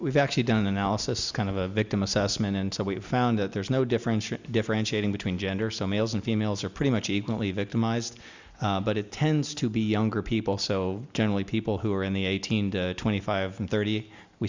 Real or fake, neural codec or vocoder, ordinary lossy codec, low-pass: real; none; Opus, 64 kbps; 7.2 kHz